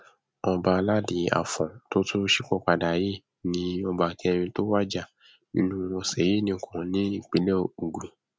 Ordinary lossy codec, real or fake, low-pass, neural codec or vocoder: none; real; none; none